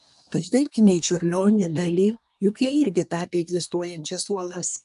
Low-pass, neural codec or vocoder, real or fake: 10.8 kHz; codec, 24 kHz, 1 kbps, SNAC; fake